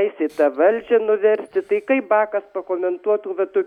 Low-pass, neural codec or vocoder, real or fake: 19.8 kHz; none; real